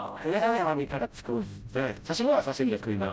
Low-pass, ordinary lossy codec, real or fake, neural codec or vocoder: none; none; fake; codec, 16 kHz, 0.5 kbps, FreqCodec, smaller model